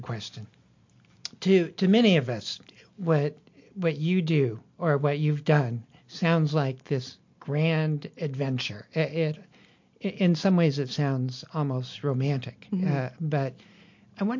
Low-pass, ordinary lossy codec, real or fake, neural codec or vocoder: 7.2 kHz; MP3, 48 kbps; real; none